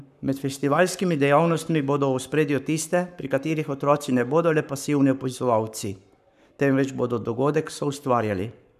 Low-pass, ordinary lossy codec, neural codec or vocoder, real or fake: 14.4 kHz; none; codec, 44.1 kHz, 7.8 kbps, Pupu-Codec; fake